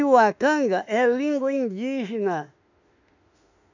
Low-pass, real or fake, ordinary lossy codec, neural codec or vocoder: 7.2 kHz; fake; none; autoencoder, 48 kHz, 32 numbers a frame, DAC-VAE, trained on Japanese speech